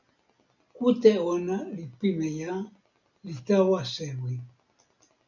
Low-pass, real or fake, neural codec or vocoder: 7.2 kHz; real; none